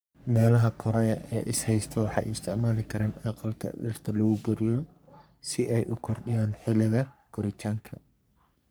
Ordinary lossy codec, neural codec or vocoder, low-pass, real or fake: none; codec, 44.1 kHz, 3.4 kbps, Pupu-Codec; none; fake